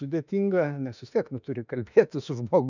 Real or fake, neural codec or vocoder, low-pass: fake; autoencoder, 48 kHz, 32 numbers a frame, DAC-VAE, trained on Japanese speech; 7.2 kHz